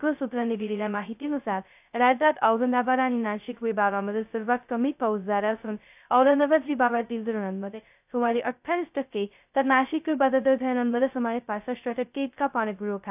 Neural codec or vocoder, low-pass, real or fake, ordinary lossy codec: codec, 16 kHz, 0.2 kbps, FocalCodec; 3.6 kHz; fake; AAC, 32 kbps